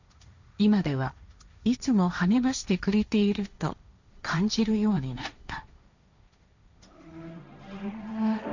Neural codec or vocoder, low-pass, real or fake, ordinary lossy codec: codec, 16 kHz, 1.1 kbps, Voila-Tokenizer; 7.2 kHz; fake; none